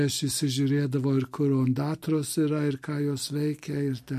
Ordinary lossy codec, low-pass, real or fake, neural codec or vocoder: MP3, 64 kbps; 14.4 kHz; real; none